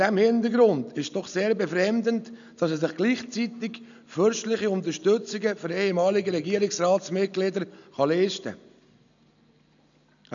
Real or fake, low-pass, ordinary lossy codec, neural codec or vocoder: real; 7.2 kHz; none; none